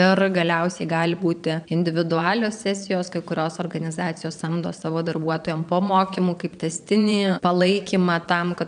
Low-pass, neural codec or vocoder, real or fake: 9.9 kHz; vocoder, 22.05 kHz, 80 mel bands, Vocos; fake